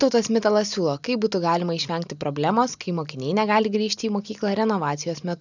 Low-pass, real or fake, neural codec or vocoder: 7.2 kHz; real; none